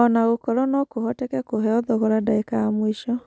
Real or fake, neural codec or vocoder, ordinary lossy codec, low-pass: real; none; none; none